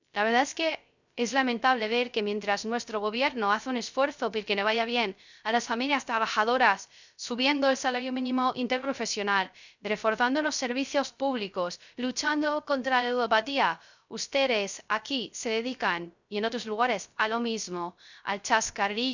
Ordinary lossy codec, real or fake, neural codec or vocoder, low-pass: none; fake; codec, 16 kHz, 0.3 kbps, FocalCodec; 7.2 kHz